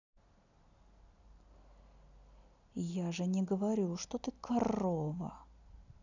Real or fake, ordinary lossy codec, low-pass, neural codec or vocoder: real; none; 7.2 kHz; none